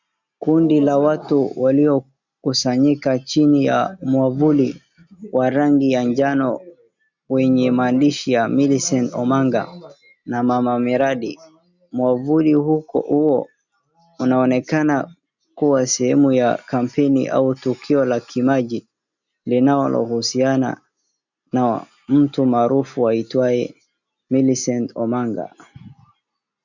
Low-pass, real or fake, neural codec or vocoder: 7.2 kHz; real; none